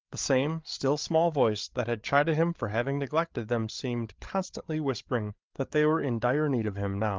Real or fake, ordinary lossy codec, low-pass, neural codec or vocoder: fake; Opus, 32 kbps; 7.2 kHz; codec, 16 kHz, 8 kbps, FreqCodec, larger model